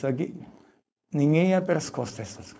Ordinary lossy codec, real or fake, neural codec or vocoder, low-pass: none; fake; codec, 16 kHz, 4.8 kbps, FACodec; none